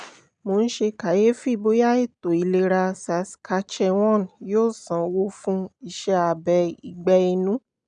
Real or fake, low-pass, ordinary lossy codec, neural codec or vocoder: real; 9.9 kHz; none; none